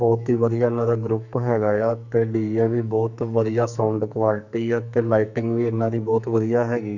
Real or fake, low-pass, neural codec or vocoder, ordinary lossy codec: fake; 7.2 kHz; codec, 44.1 kHz, 2.6 kbps, SNAC; none